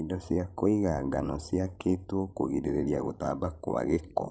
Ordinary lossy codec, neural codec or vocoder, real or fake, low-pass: none; codec, 16 kHz, 16 kbps, FreqCodec, larger model; fake; none